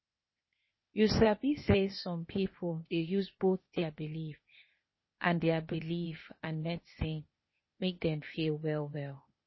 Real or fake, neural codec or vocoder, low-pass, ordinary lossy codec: fake; codec, 16 kHz, 0.8 kbps, ZipCodec; 7.2 kHz; MP3, 24 kbps